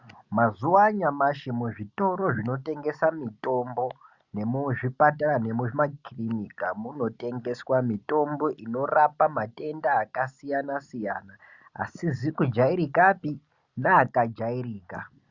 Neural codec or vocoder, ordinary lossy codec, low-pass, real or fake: none; Opus, 64 kbps; 7.2 kHz; real